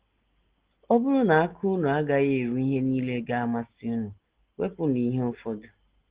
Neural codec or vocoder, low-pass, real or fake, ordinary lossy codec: none; 3.6 kHz; real; Opus, 16 kbps